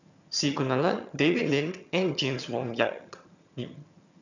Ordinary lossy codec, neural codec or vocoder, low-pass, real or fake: none; vocoder, 22.05 kHz, 80 mel bands, HiFi-GAN; 7.2 kHz; fake